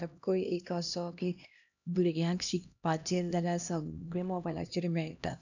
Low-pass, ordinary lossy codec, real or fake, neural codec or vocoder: 7.2 kHz; none; fake; codec, 16 kHz, 1 kbps, X-Codec, HuBERT features, trained on LibriSpeech